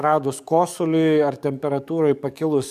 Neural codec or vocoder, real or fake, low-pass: codec, 44.1 kHz, 7.8 kbps, DAC; fake; 14.4 kHz